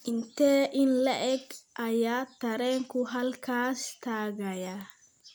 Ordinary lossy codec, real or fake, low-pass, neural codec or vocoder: none; real; none; none